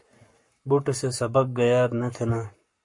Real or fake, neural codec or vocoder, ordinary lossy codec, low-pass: fake; codec, 44.1 kHz, 7.8 kbps, Pupu-Codec; MP3, 48 kbps; 10.8 kHz